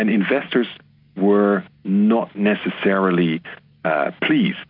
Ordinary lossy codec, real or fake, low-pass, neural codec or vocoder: MP3, 48 kbps; real; 5.4 kHz; none